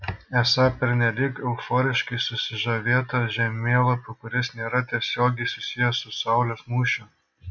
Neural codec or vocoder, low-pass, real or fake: none; 7.2 kHz; real